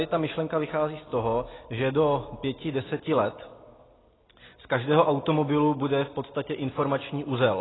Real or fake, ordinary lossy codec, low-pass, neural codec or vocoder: real; AAC, 16 kbps; 7.2 kHz; none